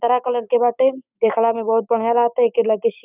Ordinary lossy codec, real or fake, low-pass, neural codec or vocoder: none; fake; 3.6 kHz; codec, 16 kHz, 6 kbps, DAC